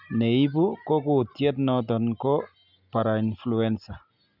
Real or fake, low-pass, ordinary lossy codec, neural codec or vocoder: real; 5.4 kHz; none; none